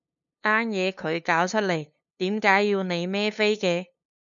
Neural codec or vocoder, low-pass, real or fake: codec, 16 kHz, 2 kbps, FunCodec, trained on LibriTTS, 25 frames a second; 7.2 kHz; fake